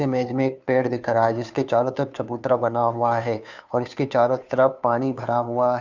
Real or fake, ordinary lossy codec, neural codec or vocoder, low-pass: fake; none; codec, 16 kHz, 2 kbps, FunCodec, trained on Chinese and English, 25 frames a second; 7.2 kHz